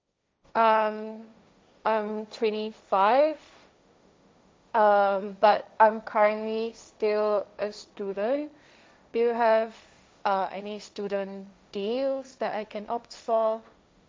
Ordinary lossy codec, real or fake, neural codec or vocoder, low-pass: none; fake; codec, 16 kHz, 1.1 kbps, Voila-Tokenizer; none